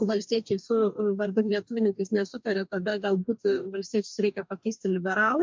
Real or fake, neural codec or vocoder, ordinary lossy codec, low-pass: fake; codec, 44.1 kHz, 2.6 kbps, DAC; MP3, 64 kbps; 7.2 kHz